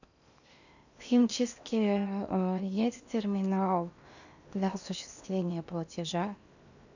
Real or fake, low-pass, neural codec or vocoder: fake; 7.2 kHz; codec, 16 kHz in and 24 kHz out, 0.8 kbps, FocalCodec, streaming, 65536 codes